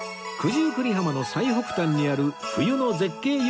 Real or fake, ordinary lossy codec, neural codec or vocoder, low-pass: real; none; none; none